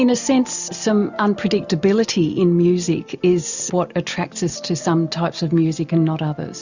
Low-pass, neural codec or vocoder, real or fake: 7.2 kHz; none; real